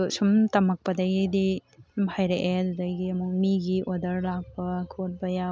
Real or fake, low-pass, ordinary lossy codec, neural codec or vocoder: real; none; none; none